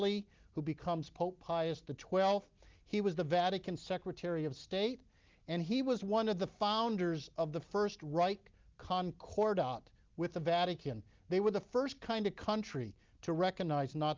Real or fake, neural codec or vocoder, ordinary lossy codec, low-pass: real; none; Opus, 32 kbps; 7.2 kHz